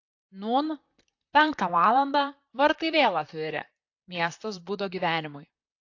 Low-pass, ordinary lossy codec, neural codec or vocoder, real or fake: 7.2 kHz; AAC, 48 kbps; vocoder, 44.1 kHz, 128 mel bands, Pupu-Vocoder; fake